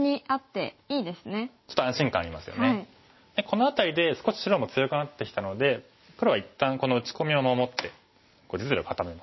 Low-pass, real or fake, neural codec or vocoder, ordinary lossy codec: 7.2 kHz; real; none; MP3, 24 kbps